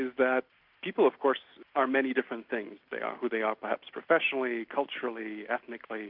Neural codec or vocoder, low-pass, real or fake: none; 5.4 kHz; real